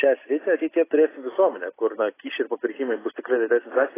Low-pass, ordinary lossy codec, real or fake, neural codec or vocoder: 3.6 kHz; AAC, 16 kbps; real; none